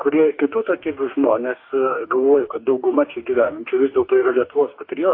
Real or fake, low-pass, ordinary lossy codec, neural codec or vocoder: fake; 5.4 kHz; AAC, 32 kbps; codec, 44.1 kHz, 2.6 kbps, DAC